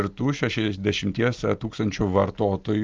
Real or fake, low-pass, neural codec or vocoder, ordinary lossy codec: real; 7.2 kHz; none; Opus, 32 kbps